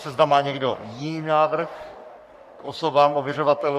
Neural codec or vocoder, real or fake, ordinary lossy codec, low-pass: codec, 44.1 kHz, 3.4 kbps, Pupu-Codec; fake; MP3, 96 kbps; 14.4 kHz